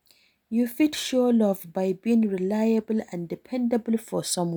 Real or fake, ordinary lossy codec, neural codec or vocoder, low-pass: real; none; none; none